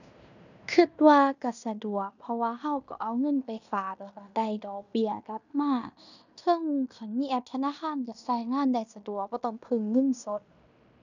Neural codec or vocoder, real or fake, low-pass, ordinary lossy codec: codec, 16 kHz in and 24 kHz out, 0.9 kbps, LongCat-Audio-Codec, fine tuned four codebook decoder; fake; 7.2 kHz; none